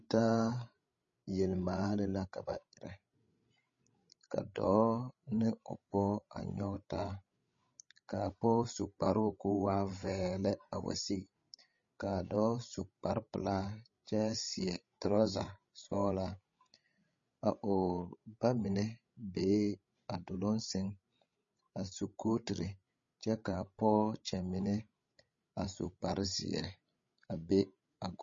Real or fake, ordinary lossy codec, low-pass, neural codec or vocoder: fake; MP3, 32 kbps; 7.2 kHz; codec, 16 kHz, 16 kbps, FreqCodec, larger model